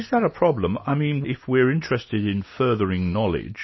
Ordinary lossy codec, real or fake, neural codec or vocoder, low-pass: MP3, 24 kbps; real; none; 7.2 kHz